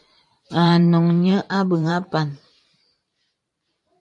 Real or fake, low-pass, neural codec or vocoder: fake; 10.8 kHz; vocoder, 44.1 kHz, 128 mel bands every 512 samples, BigVGAN v2